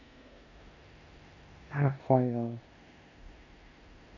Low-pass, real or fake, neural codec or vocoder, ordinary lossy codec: 7.2 kHz; fake; codec, 16 kHz in and 24 kHz out, 0.9 kbps, LongCat-Audio-Codec, four codebook decoder; none